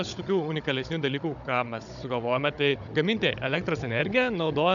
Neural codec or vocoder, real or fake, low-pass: codec, 16 kHz, 16 kbps, FunCodec, trained on Chinese and English, 50 frames a second; fake; 7.2 kHz